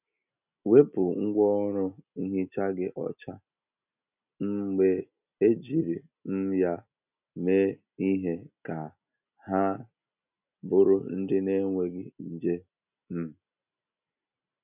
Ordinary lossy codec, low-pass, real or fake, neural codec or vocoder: none; 3.6 kHz; real; none